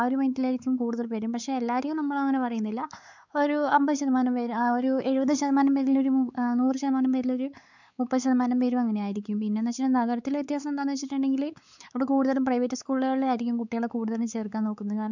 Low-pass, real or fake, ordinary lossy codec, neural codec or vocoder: 7.2 kHz; fake; none; codec, 16 kHz, 4 kbps, X-Codec, WavLM features, trained on Multilingual LibriSpeech